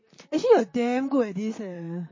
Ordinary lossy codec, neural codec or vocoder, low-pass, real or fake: MP3, 32 kbps; vocoder, 44.1 kHz, 128 mel bands, Pupu-Vocoder; 7.2 kHz; fake